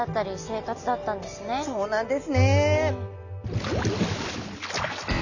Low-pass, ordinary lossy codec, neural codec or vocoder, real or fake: 7.2 kHz; none; none; real